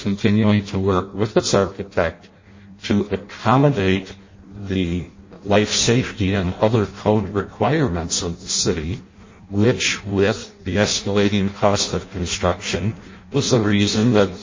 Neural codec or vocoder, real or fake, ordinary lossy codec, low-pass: codec, 16 kHz in and 24 kHz out, 0.6 kbps, FireRedTTS-2 codec; fake; MP3, 32 kbps; 7.2 kHz